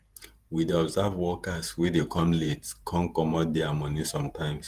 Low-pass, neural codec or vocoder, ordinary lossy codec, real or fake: 14.4 kHz; vocoder, 48 kHz, 128 mel bands, Vocos; Opus, 24 kbps; fake